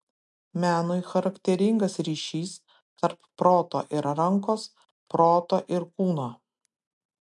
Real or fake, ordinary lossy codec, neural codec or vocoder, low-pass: real; MP3, 64 kbps; none; 10.8 kHz